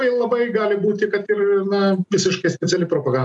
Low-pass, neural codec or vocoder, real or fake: 10.8 kHz; none; real